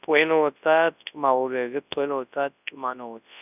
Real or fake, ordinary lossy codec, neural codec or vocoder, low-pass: fake; none; codec, 24 kHz, 0.9 kbps, WavTokenizer, large speech release; 3.6 kHz